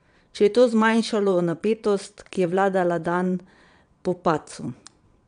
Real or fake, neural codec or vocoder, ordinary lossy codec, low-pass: fake; vocoder, 22.05 kHz, 80 mel bands, Vocos; none; 9.9 kHz